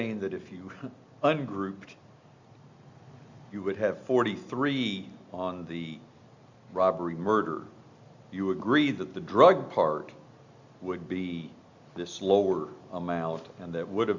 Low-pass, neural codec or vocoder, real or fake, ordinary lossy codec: 7.2 kHz; none; real; Opus, 64 kbps